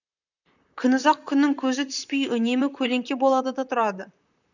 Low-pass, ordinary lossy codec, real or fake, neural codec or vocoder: 7.2 kHz; none; fake; vocoder, 44.1 kHz, 128 mel bands, Pupu-Vocoder